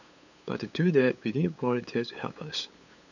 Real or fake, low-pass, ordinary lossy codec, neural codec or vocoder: fake; 7.2 kHz; none; codec, 16 kHz, 8 kbps, FunCodec, trained on LibriTTS, 25 frames a second